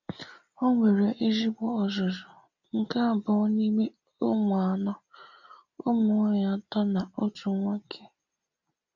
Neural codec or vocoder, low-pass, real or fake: none; 7.2 kHz; real